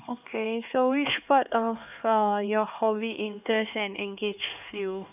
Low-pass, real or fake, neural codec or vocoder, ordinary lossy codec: 3.6 kHz; fake; codec, 16 kHz, 2 kbps, X-Codec, HuBERT features, trained on LibriSpeech; none